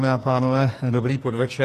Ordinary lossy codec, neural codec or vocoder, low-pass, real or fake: AAC, 48 kbps; codec, 44.1 kHz, 2.6 kbps, SNAC; 14.4 kHz; fake